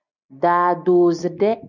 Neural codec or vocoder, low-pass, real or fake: none; 7.2 kHz; real